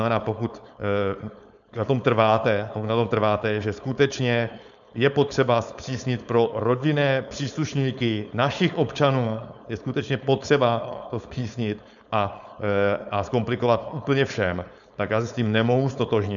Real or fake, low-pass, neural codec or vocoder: fake; 7.2 kHz; codec, 16 kHz, 4.8 kbps, FACodec